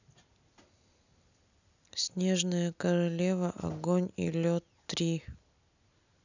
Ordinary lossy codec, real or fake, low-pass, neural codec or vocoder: none; real; 7.2 kHz; none